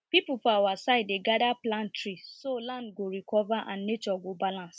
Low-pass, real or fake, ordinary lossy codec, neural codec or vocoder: none; real; none; none